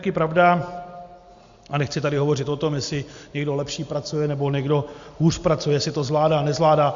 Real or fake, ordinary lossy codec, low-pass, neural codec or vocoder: real; Opus, 64 kbps; 7.2 kHz; none